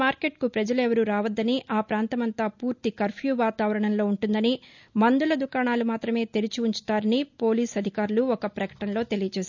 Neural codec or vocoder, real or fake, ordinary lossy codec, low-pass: none; real; none; 7.2 kHz